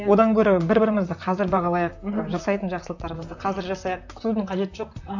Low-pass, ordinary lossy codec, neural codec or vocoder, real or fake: 7.2 kHz; none; vocoder, 44.1 kHz, 128 mel bands, Pupu-Vocoder; fake